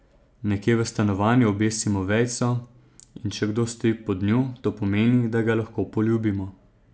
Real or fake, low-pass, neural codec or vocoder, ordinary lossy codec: real; none; none; none